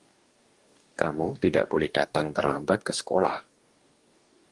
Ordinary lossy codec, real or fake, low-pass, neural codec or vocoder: Opus, 24 kbps; fake; 10.8 kHz; codec, 44.1 kHz, 2.6 kbps, DAC